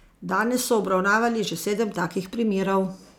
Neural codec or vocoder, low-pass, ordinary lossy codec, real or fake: none; 19.8 kHz; none; real